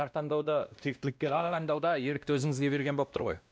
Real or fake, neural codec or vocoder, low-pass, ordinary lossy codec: fake; codec, 16 kHz, 1 kbps, X-Codec, WavLM features, trained on Multilingual LibriSpeech; none; none